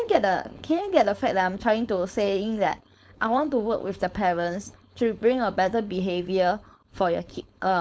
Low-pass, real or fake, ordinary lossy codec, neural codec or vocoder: none; fake; none; codec, 16 kHz, 4.8 kbps, FACodec